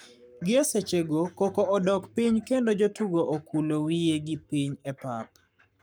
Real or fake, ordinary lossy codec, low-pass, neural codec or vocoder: fake; none; none; codec, 44.1 kHz, 7.8 kbps, Pupu-Codec